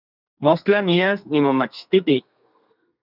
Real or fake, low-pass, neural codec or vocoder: fake; 5.4 kHz; codec, 32 kHz, 1.9 kbps, SNAC